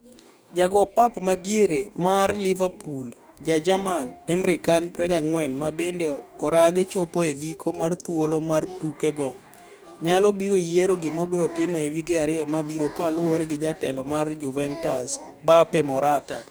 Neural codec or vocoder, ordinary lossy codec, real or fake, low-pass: codec, 44.1 kHz, 2.6 kbps, DAC; none; fake; none